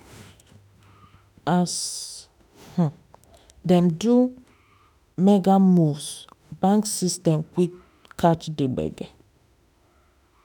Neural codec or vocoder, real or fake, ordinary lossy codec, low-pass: autoencoder, 48 kHz, 32 numbers a frame, DAC-VAE, trained on Japanese speech; fake; none; 19.8 kHz